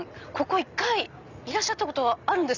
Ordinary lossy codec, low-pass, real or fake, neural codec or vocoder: none; 7.2 kHz; real; none